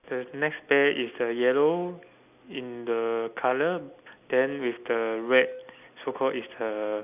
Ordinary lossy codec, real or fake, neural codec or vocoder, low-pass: none; real; none; 3.6 kHz